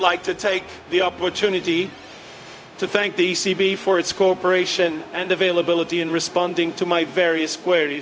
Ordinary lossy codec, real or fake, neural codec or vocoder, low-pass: none; fake; codec, 16 kHz, 0.4 kbps, LongCat-Audio-Codec; none